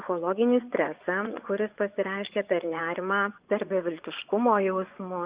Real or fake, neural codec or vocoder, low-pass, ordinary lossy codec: fake; vocoder, 24 kHz, 100 mel bands, Vocos; 3.6 kHz; Opus, 64 kbps